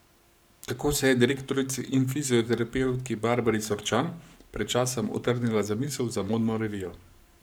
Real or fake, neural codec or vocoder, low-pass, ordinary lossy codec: fake; codec, 44.1 kHz, 7.8 kbps, Pupu-Codec; none; none